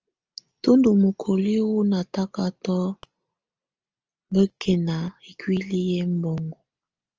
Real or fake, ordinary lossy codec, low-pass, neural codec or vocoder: real; Opus, 24 kbps; 7.2 kHz; none